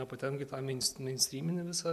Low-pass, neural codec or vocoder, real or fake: 14.4 kHz; none; real